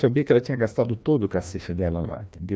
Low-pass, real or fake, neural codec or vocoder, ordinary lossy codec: none; fake; codec, 16 kHz, 1 kbps, FreqCodec, larger model; none